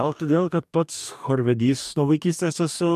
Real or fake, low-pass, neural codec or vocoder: fake; 14.4 kHz; codec, 44.1 kHz, 2.6 kbps, DAC